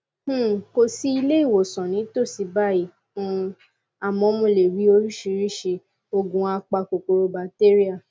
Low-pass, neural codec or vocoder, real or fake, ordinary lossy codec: none; none; real; none